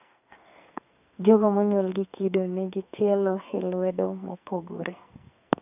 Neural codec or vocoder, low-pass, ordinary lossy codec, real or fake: codec, 44.1 kHz, 2.6 kbps, SNAC; 3.6 kHz; none; fake